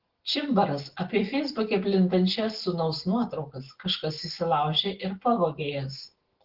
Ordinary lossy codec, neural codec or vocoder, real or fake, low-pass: Opus, 16 kbps; none; real; 5.4 kHz